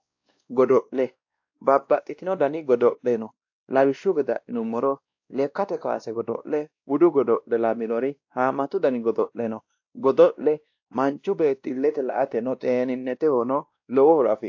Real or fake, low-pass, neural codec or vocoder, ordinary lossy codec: fake; 7.2 kHz; codec, 16 kHz, 1 kbps, X-Codec, WavLM features, trained on Multilingual LibriSpeech; AAC, 48 kbps